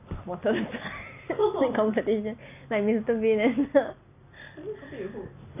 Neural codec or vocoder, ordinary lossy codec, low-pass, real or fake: none; none; 3.6 kHz; real